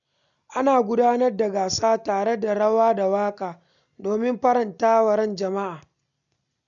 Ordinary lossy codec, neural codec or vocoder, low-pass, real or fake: none; none; 7.2 kHz; real